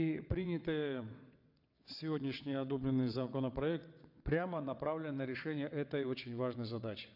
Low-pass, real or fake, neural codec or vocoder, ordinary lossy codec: 5.4 kHz; fake; vocoder, 22.05 kHz, 80 mel bands, WaveNeXt; MP3, 48 kbps